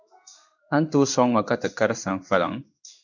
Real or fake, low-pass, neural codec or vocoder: fake; 7.2 kHz; codec, 16 kHz in and 24 kHz out, 1 kbps, XY-Tokenizer